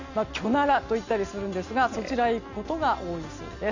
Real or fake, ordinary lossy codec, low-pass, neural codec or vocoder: real; Opus, 64 kbps; 7.2 kHz; none